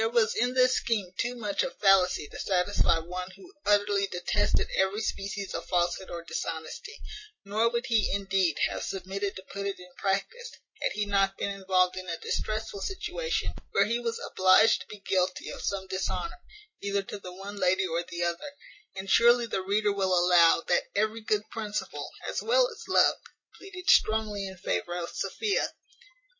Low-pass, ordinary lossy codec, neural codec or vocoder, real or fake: 7.2 kHz; MP3, 32 kbps; none; real